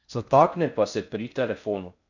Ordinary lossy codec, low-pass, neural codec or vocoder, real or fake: none; 7.2 kHz; codec, 16 kHz in and 24 kHz out, 0.6 kbps, FocalCodec, streaming, 4096 codes; fake